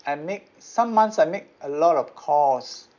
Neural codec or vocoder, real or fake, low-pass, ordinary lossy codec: none; real; 7.2 kHz; none